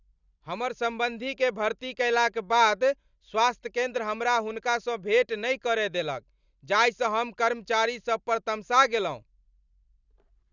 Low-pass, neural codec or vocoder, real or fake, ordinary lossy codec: 7.2 kHz; none; real; none